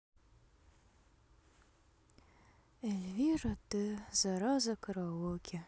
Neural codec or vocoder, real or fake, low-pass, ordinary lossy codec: none; real; none; none